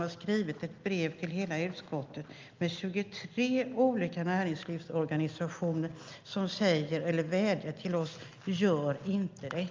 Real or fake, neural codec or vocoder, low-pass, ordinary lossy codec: real; none; 7.2 kHz; Opus, 24 kbps